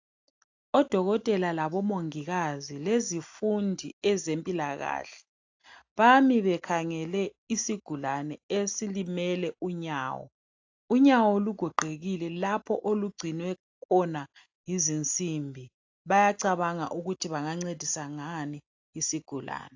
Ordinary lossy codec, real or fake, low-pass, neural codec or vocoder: AAC, 48 kbps; real; 7.2 kHz; none